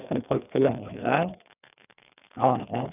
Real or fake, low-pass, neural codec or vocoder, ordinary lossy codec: fake; 3.6 kHz; codec, 16 kHz, 2 kbps, FreqCodec, larger model; none